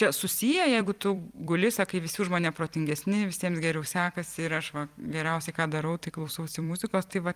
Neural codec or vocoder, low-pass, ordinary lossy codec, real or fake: vocoder, 44.1 kHz, 128 mel bands every 256 samples, BigVGAN v2; 14.4 kHz; Opus, 32 kbps; fake